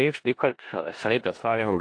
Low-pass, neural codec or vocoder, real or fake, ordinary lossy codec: 9.9 kHz; codec, 16 kHz in and 24 kHz out, 0.4 kbps, LongCat-Audio-Codec, four codebook decoder; fake; AAC, 48 kbps